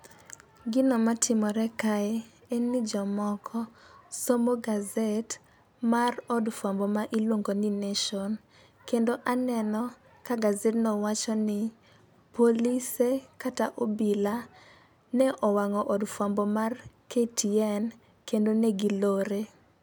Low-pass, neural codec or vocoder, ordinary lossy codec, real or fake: none; none; none; real